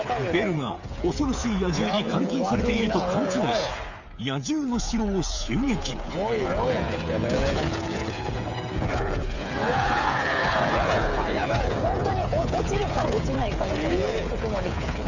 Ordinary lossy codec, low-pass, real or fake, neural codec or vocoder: none; 7.2 kHz; fake; codec, 16 kHz, 8 kbps, FreqCodec, smaller model